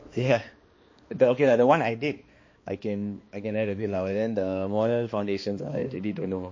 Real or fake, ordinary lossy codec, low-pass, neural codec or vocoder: fake; MP3, 32 kbps; 7.2 kHz; codec, 16 kHz, 2 kbps, X-Codec, HuBERT features, trained on balanced general audio